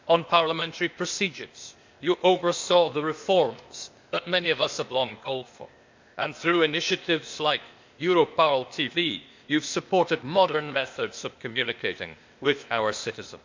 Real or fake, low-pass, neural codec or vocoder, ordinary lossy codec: fake; 7.2 kHz; codec, 16 kHz, 0.8 kbps, ZipCodec; MP3, 64 kbps